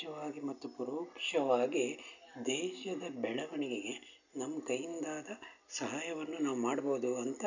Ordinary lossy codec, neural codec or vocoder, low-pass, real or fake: none; none; 7.2 kHz; real